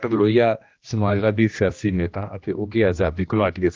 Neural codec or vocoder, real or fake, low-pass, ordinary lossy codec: codec, 16 kHz, 1 kbps, X-Codec, HuBERT features, trained on general audio; fake; 7.2 kHz; Opus, 24 kbps